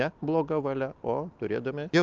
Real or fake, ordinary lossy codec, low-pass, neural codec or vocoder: real; Opus, 24 kbps; 7.2 kHz; none